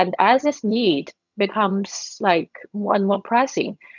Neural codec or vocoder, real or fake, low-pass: vocoder, 22.05 kHz, 80 mel bands, HiFi-GAN; fake; 7.2 kHz